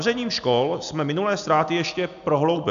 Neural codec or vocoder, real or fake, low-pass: none; real; 7.2 kHz